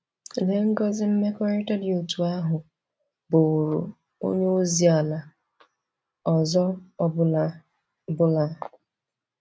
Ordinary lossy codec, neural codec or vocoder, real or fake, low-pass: none; none; real; none